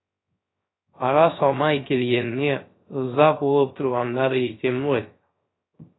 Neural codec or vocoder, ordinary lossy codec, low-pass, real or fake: codec, 16 kHz, 0.3 kbps, FocalCodec; AAC, 16 kbps; 7.2 kHz; fake